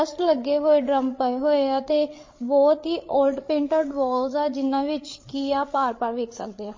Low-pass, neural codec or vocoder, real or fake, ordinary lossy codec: 7.2 kHz; codec, 16 kHz, 8 kbps, FreqCodec, larger model; fake; MP3, 32 kbps